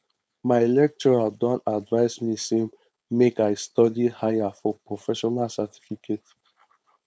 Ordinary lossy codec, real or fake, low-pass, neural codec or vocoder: none; fake; none; codec, 16 kHz, 4.8 kbps, FACodec